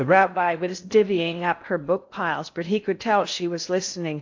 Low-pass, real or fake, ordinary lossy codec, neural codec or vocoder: 7.2 kHz; fake; AAC, 48 kbps; codec, 16 kHz in and 24 kHz out, 0.6 kbps, FocalCodec, streaming, 4096 codes